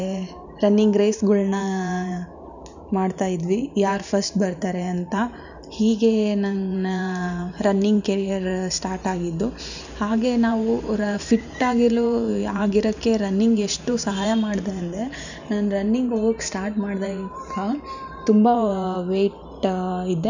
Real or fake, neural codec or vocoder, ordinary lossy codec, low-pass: fake; vocoder, 44.1 kHz, 128 mel bands every 512 samples, BigVGAN v2; none; 7.2 kHz